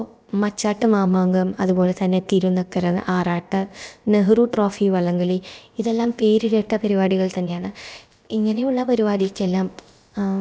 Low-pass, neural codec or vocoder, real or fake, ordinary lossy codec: none; codec, 16 kHz, about 1 kbps, DyCAST, with the encoder's durations; fake; none